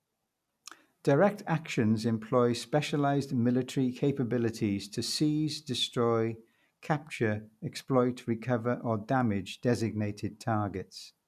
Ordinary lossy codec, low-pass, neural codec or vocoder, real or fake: none; 14.4 kHz; none; real